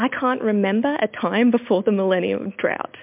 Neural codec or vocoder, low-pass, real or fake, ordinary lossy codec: none; 3.6 kHz; real; MP3, 32 kbps